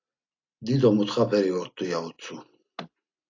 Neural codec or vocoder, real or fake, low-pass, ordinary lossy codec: none; real; 7.2 kHz; AAC, 48 kbps